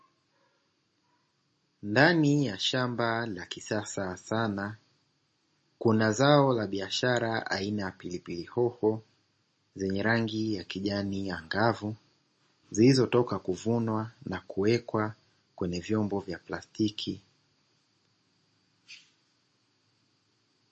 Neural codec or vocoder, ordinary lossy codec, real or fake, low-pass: none; MP3, 32 kbps; real; 10.8 kHz